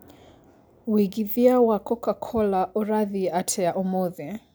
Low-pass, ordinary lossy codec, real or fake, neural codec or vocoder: none; none; real; none